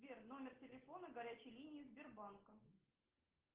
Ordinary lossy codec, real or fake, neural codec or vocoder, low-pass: Opus, 16 kbps; real; none; 3.6 kHz